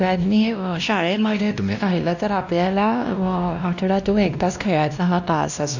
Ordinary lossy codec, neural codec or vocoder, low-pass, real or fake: none; codec, 16 kHz, 1 kbps, X-Codec, WavLM features, trained on Multilingual LibriSpeech; 7.2 kHz; fake